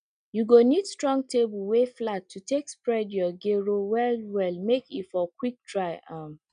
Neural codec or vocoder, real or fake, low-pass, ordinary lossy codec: none; real; 9.9 kHz; none